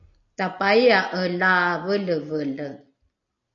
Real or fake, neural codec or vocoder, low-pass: real; none; 7.2 kHz